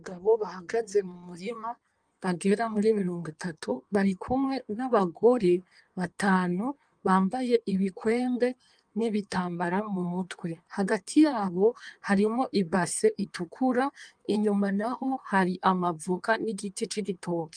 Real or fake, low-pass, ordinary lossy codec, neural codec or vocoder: fake; 9.9 kHz; Opus, 32 kbps; codec, 16 kHz in and 24 kHz out, 1.1 kbps, FireRedTTS-2 codec